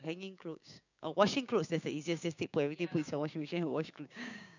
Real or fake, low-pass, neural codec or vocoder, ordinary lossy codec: fake; 7.2 kHz; codec, 16 kHz, 6 kbps, DAC; none